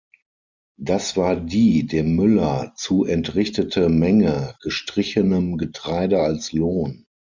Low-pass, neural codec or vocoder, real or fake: 7.2 kHz; none; real